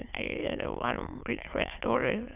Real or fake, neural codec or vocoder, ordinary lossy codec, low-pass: fake; autoencoder, 22.05 kHz, a latent of 192 numbers a frame, VITS, trained on many speakers; none; 3.6 kHz